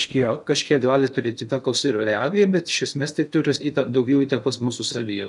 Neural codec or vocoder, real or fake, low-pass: codec, 16 kHz in and 24 kHz out, 0.6 kbps, FocalCodec, streaming, 4096 codes; fake; 10.8 kHz